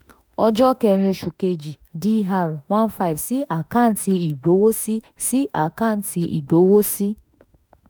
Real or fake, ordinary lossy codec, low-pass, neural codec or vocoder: fake; none; none; autoencoder, 48 kHz, 32 numbers a frame, DAC-VAE, trained on Japanese speech